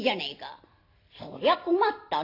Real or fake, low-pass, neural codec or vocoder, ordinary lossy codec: real; 5.4 kHz; none; AAC, 32 kbps